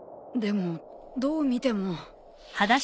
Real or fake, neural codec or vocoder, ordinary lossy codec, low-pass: real; none; none; none